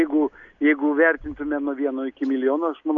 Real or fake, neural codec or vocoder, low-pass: real; none; 7.2 kHz